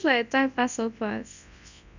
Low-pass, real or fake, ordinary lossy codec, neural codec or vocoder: 7.2 kHz; fake; none; codec, 24 kHz, 0.9 kbps, WavTokenizer, large speech release